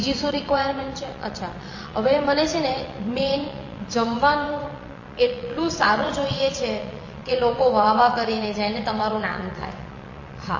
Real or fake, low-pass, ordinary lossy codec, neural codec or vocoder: fake; 7.2 kHz; MP3, 32 kbps; vocoder, 22.05 kHz, 80 mel bands, WaveNeXt